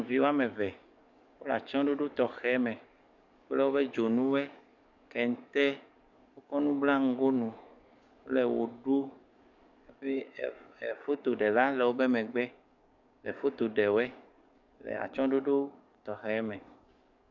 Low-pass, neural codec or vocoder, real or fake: 7.2 kHz; codec, 44.1 kHz, 7.8 kbps, DAC; fake